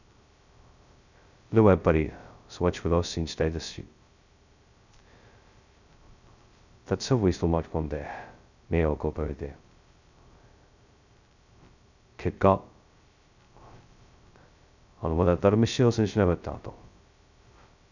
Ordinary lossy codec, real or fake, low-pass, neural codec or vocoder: none; fake; 7.2 kHz; codec, 16 kHz, 0.2 kbps, FocalCodec